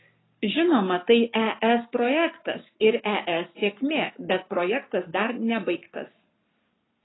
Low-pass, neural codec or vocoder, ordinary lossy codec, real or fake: 7.2 kHz; codec, 44.1 kHz, 7.8 kbps, Pupu-Codec; AAC, 16 kbps; fake